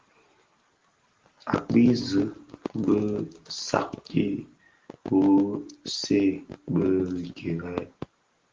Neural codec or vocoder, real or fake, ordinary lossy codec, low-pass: none; real; Opus, 16 kbps; 7.2 kHz